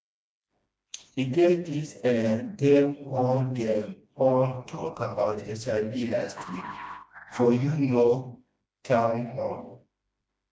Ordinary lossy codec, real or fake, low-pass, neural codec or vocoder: none; fake; none; codec, 16 kHz, 1 kbps, FreqCodec, smaller model